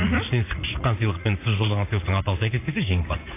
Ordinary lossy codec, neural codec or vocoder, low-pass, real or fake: AAC, 24 kbps; vocoder, 44.1 kHz, 80 mel bands, Vocos; 3.6 kHz; fake